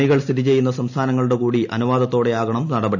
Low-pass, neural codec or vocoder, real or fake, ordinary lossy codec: 7.2 kHz; none; real; none